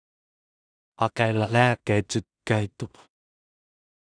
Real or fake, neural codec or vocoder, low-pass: fake; codec, 16 kHz in and 24 kHz out, 0.4 kbps, LongCat-Audio-Codec, two codebook decoder; 9.9 kHz